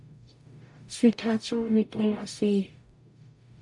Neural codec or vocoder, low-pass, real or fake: codec, 44.1 kHz, 0.9 kbps, DAC; 10.8 kHz; fake